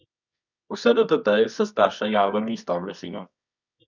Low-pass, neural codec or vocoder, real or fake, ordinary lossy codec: 7.2 kHz; codec, 24 kHz, 0.9 kbps, WavTokenizer, medium music audio release; fake; none